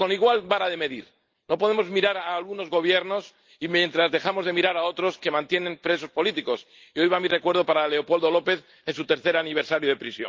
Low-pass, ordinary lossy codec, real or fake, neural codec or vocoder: 7.2 kHz; Opus, 32 kbps; real; none